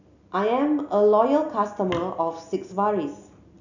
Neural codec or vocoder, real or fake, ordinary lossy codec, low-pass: none; real; none; 7.2 kHz